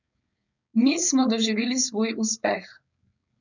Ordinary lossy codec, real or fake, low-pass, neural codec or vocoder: none; fake; 7.2 kHz; codec, 16 kHz, 4.8 kbps, FACodec